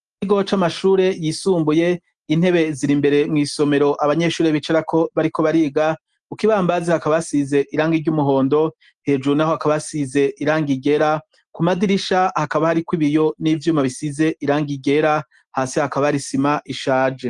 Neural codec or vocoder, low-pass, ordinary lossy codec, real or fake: none; 10.8 kHz; Opus, 24 kbps; real